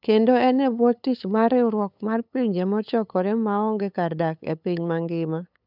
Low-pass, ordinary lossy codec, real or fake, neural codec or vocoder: 5.4 kHz; none; fake; codec, 16 kHz, 8 kbps, FunCodec, trained on LibriTTS, 25 frames a second